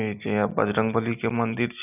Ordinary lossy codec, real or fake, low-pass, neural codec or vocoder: none; real; 3.6 kHz; none